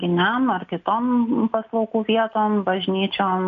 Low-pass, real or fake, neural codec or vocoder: 7.2 kHz; real; none